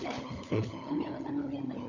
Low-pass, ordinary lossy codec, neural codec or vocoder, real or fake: 7.2 kHz; none; codec, 16 kHz, 16 kbps, FunCodec, trained on LibriTTS, 50 frames a second; fake